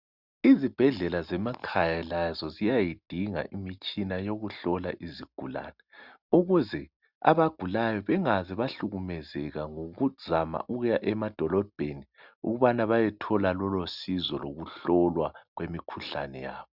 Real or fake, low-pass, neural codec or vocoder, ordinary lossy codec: real; 5.4 kHz; none; Opus, 64 kbps